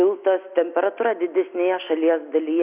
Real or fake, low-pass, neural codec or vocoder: real; 3.6 kHz; none